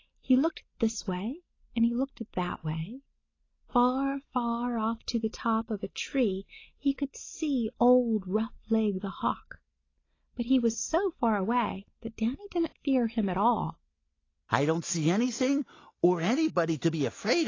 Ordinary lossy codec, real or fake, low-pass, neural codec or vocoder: AAC, 32 kbps; real; 7.2 kHz; none